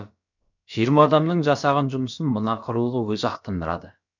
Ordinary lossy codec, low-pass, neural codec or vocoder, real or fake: none; 7.2 kHz; codec, 16 kHz, about 1 kbps, DyCAST, with the encoder's durations; fake